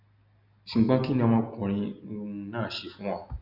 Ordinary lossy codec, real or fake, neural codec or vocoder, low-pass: none; real; none; 5.4 kHz